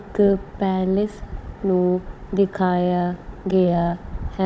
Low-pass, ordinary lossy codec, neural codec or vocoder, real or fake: none; none; codec, 16 kHz, 16 kbps, FunCodec, trained on Chinese and English, 50 frames a second; fake